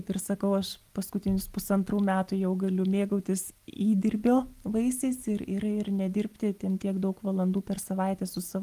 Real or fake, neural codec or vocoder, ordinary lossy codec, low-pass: real; none; Opus, 32 kbps; 14.4 kHz